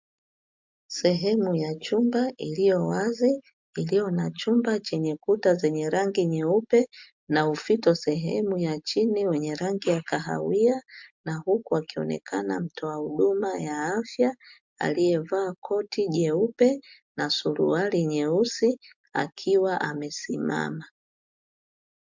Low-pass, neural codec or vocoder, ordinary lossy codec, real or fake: 7.2 kHz; none; MP3, 64 kbps; real